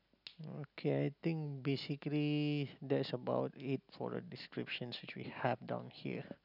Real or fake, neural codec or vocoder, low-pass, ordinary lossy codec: real; none; 5.4 kHz; none